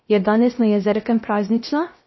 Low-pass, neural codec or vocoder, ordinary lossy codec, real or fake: 7.2 kHz; codec, 16 kHz, 0.3 kbps, FocalCodec; MP3, 24 kbps; fake